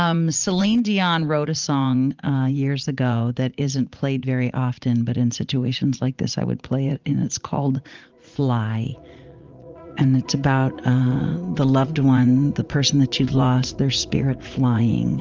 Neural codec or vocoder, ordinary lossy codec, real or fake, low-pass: vocoder, 44.1 kHz, 80 mel bands, Vocos; Opus, 24 kbps; fake; 7.2 kHz